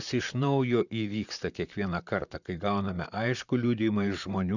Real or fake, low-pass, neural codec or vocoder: fake; 7.2 kHz; vocoder, 44.1 kHz, 128 mel bands, Pupu-Vocoder